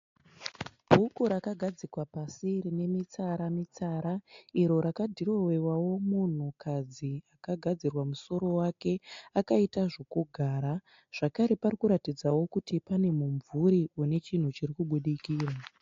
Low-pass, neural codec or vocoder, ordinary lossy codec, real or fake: 7.2 kHz; none; AAC, 48 kbps; real